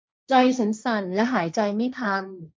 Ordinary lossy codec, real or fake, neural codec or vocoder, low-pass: none; fake; codec, 16 kHz, 1.1 kbps, Voila-Tokenizer; none